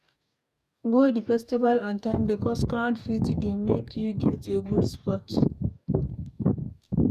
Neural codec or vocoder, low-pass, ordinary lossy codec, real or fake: codec, 44.1 kHz, 2.6 kbps, DAC; 14.4 kHz; none; fake